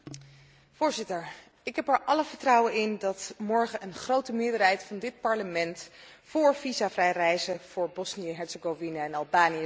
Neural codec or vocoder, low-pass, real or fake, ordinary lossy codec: none; none; real; none